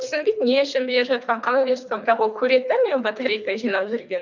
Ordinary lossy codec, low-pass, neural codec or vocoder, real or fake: none; 7.2 kHz; codec, 24 kHz, 3 kbps, HILCodec; fake